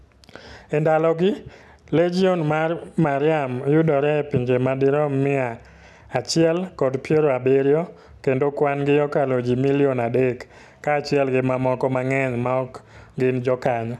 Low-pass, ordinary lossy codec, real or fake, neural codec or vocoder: none; none; real; none